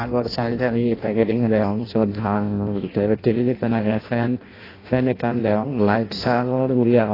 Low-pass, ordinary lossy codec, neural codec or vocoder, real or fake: 5.4 kHz; AAC, 24 kbps; codec, 16 kHz in and 24 kHz out, 0.6 kbps, FireRedTTS-2 codec; fake